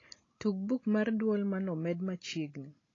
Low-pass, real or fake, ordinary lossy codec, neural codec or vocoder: 7.2 kHz; real; AAC, 32 kbps; none